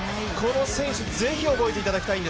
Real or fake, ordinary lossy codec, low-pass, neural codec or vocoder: real; none; none; none